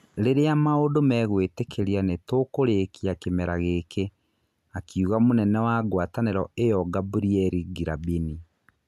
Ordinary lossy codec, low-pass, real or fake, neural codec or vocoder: none; 14.4 kHz; real; none